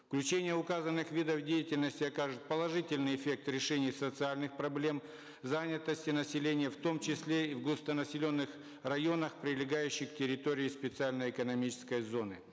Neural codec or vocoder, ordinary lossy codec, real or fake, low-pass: none; none; real; none